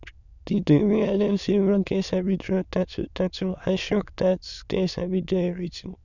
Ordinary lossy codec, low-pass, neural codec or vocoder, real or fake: none; 7.2 kHz; autoencoder, 22.05 kHz, a latent of 192 numbers a frame, VITS, trained on many speakers; fake